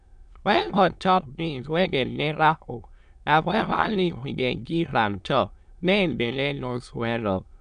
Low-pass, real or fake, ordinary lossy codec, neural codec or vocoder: 9.9 kHz; fake; none; autoencoder, 22.05 kHz, a latent of 192 numbers a frame, VITS, trained on many speakers